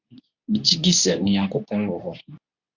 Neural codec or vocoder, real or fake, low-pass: codec, 24 kHz, 0.9 kbps, WavTokenizer, medium speech release version 2; fake; 7.2 kHz